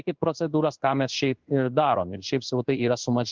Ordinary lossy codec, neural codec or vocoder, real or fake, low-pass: Opus, 32 kbps; codec, 16 kHz in and 24 kHz out, 1 kbps, XY-Tokenizer; fake; 7.2 kHz